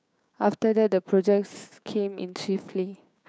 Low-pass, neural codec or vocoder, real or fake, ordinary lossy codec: none; codec, 16 kHz, 6 kbps, DAC; fake; none